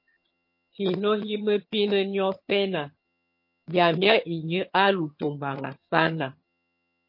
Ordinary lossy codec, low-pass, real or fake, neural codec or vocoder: MP3, 32 kbps; 5.4 kHz; fake; vocoder, 22.05 kHz, 80 mel bands, HiFi-GAN